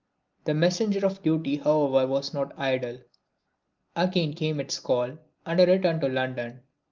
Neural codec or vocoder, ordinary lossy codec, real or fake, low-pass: none; Opus, 32 kbps; real; 7.2 kHz